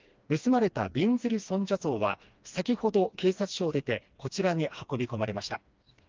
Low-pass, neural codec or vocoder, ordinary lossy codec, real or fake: 7.2 kHz; codec, 16 kHz, 2 kbps, FreqCodec, smaller model; Opus, 24 kbps; fake